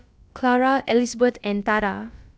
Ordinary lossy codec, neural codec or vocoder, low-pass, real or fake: none; codec, 16 kHz, about 1 kbps, DyCAST, with the encoder's durations; none; fake